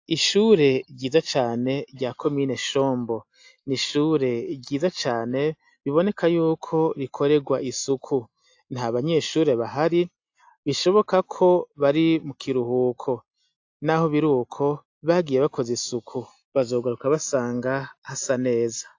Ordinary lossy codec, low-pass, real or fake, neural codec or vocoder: AAC, 48 kbps; 7.2 kHz; real; none